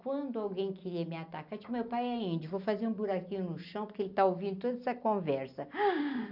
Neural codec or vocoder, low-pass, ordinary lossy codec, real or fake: none; 5.4 kHz; none; real